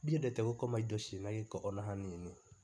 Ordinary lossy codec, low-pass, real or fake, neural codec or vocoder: none; none; real; none